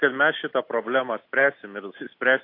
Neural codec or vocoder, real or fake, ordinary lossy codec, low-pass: none; real; AAC, 32 kbps; 5.4 kHz